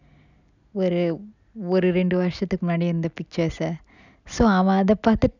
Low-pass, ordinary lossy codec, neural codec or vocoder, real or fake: 7.2 kHz; none; none; real